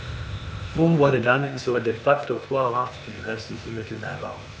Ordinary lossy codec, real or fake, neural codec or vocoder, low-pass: none; fake; codec, 16 kHz, 0.8 kbps, ZipCodec; none